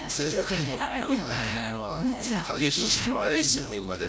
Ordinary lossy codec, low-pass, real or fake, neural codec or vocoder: none; none; fake; codec, 16 kHz, 0.5 kbps, FreqCodec, larger model